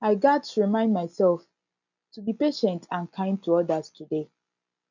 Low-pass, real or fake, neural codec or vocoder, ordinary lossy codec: 7.2 kHz; real; none; none